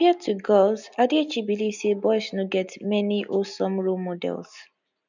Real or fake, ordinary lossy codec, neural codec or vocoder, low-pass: real; none; none; 7.2 kHz